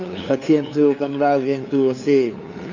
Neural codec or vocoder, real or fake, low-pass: codec, 16 kHz, 2 kbps, FunCodec, trained on LibriTTS, 25 frames a second; fake; 7.2 kHz